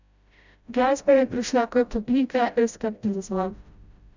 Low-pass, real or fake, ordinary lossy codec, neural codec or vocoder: 7.2 kHz; fake; none; codec, 16 kHz, 0.5 kbps, FreqCodec, smaller model